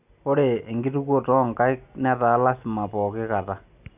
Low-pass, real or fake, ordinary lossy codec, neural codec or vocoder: 3.6 kHz; real; none; none